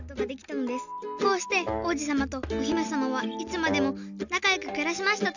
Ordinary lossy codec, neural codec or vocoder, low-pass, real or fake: none; none; 7.2 kHz; real